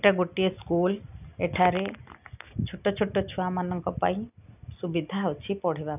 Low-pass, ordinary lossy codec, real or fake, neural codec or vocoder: 3.6 kHz; none; real; none